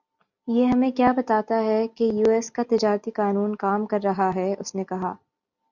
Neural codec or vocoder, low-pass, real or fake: none; 7.2 kHz; real